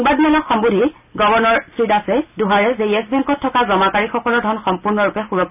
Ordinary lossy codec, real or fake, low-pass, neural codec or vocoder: none; real; 3.6 kHz; none